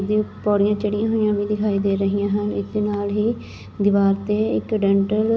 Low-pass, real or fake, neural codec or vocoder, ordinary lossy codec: none; real; none; none